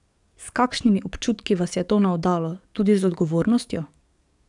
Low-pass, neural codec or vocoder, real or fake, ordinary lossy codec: 10.8 kHz; codec, 44.1 kHz, 7.8 kbps, DAC; fake; none